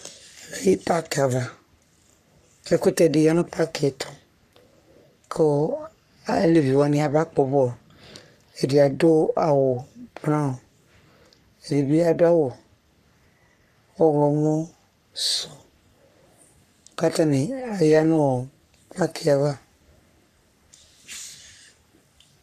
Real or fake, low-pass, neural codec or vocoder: fake; 14.4 kHz; codec, 44.1 kHz, 3.4 kbps, Pupu-Codec